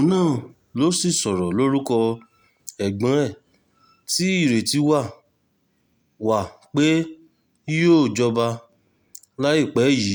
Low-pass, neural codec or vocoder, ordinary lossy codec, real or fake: none; none; none; real